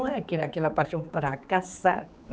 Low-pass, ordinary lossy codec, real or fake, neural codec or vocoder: none; none; fake; codec, 16 kHz, 4 kbps, X-Codec, HuBERT features, trained on general audio